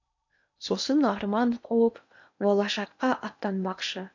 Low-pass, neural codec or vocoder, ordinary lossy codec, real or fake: 7.2 kHz; codec, 16 kHz in and 24 kHz out, 0.8 kbps, FocalCodec, streaming, 65536 codes; none; fake